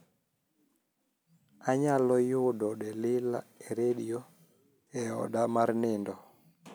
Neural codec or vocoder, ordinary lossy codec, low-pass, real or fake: none; none; none; real